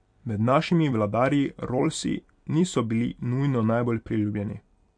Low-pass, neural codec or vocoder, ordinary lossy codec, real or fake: 9.9 kHz; vocoder, 44.1 kHz, 128 mel bands every 256 samples, BigVGAN v2; MP3, 64 kbps; fake